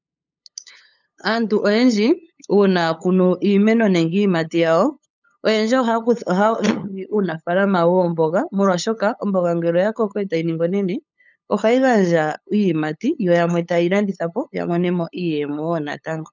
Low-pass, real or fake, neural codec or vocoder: 7.2 kHz; fake; codec, 16 kHz, 8 kbps, FunCodec, trained on LibriTTS, 25 frames a second